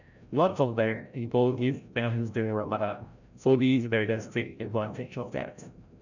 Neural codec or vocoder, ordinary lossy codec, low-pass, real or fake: codec, 16 kHz, 0.5 kbps, FreqCodec, larger model; none; 7.2 kHz; fake